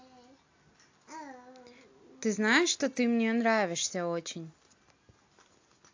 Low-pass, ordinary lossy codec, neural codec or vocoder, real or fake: 7.2 kHz; AAC, 48 kbps; none; real